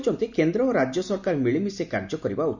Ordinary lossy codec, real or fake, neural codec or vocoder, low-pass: none; real; none; 7.2 kHz